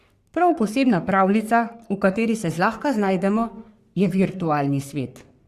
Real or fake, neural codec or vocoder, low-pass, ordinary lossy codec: fake; codec, 44.1 kHz, 3.4 kbps, Pupu-Codec; 14.4 kHz; Opus, 64 kbps